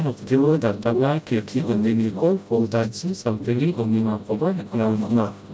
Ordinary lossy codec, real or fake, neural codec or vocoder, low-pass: none; fake; codec, 16 kHz, 0.5 kbps, FreqCodec, smaller model; none